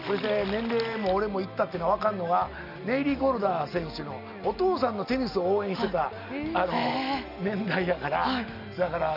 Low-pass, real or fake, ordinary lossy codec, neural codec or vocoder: 5.4 kHz; real; none; none